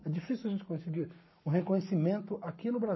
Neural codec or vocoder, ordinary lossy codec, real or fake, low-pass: codec, 44.1 kHz, 7.8 kbps, Pupu-Codec; MP3, 24 kbps; fake; 7.2 kHz